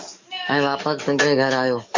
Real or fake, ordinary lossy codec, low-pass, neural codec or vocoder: fake; MP3, 64 kbps; 7.2 kHz; codec, 44.1 kHz, 7.8 kbps, DAC